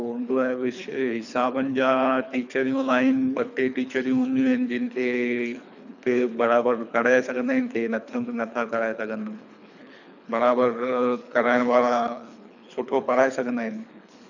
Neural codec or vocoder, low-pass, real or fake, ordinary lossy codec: codec, 24 kHz, 3 kbps, HILCodec; 7.2 kHz; fake; none